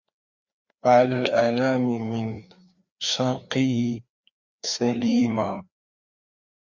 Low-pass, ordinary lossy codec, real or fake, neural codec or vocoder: 7.2 kHz; Opus, 64 kbps; fake; codec, 16 kHz, 2 kbps, FreqCodec, larger model